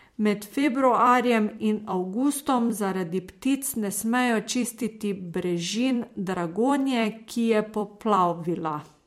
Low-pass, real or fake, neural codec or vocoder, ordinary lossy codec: 19.8 kHz; fake; vocoder, 44.1 kHz, 128 mel bands every 256 samples, BigVGAN v2; MP3, 64 kbps